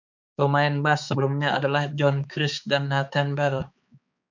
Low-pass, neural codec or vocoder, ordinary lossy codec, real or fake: 7.2 kHz; codec, 16 kHz, 4 kbps, X-Codec, HuBERT features, trained on balanced general audio; MP3, 64 kbps; fake